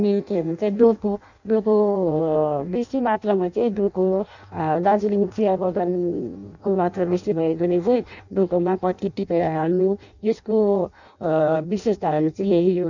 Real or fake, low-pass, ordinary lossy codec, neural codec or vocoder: fake; 7.2 kHz; none; codec, 16 kHz in and 24 kHz out, 0.6 kbps, FireRedTTS-2 codec